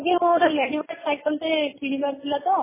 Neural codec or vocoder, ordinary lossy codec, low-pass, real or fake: none; MP3, 16 kbps; 3.6 kHz; real